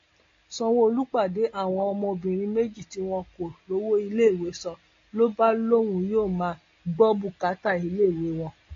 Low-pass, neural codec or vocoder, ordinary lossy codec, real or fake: 7.2 kHz; none; AAC, 32 kbps; real